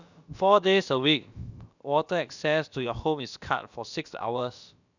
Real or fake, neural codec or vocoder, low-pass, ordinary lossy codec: fake; codec, 16 kHz, about 1 kbps, DyCAST, with the encoder's durations; 7.2 kHz; none